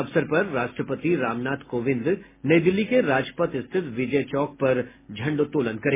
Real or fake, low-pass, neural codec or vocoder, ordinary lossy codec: real; 3.6 kHz; none; MP3, 16 kbps